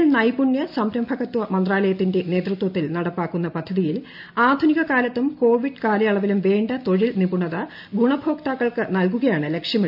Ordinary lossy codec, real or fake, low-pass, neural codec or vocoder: MP3, 48 kbps; real; 5.4 kHz; none